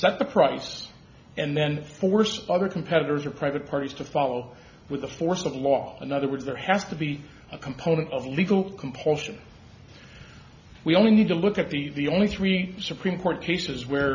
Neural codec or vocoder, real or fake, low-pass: none; real; 7.2 kHz